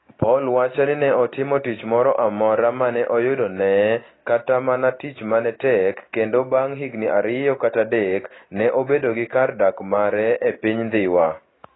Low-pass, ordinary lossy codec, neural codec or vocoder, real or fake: 7.2 kHz; AAC, 16 kbps; none; real